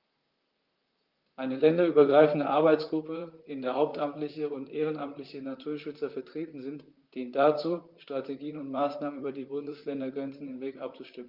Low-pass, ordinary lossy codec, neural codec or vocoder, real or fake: 5.4 kHz; Opus, 24 kbps; vocoder, 44.1 kHz, 128 mel bands, Pupu-Vocoder; fake